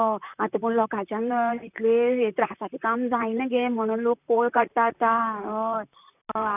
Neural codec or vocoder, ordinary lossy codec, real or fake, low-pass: vocoder, 44.1 kHz, 128 mel bands, Pupu-Vocoder; none; fake; 3.6 kHz